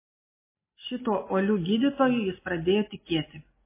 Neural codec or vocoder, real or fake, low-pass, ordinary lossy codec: none; real; 3.6 kHz; MP3, 16 kbps